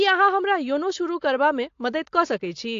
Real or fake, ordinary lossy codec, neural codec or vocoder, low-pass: real; AAC, 48 kbps; none; 7.2 kHz